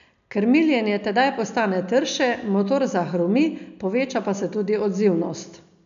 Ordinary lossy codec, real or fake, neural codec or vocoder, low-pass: none; real; none; 7.2 kHz